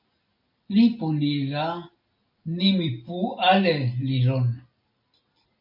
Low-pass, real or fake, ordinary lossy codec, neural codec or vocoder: 5.4 kHz; real; MP3, 48 kbps; none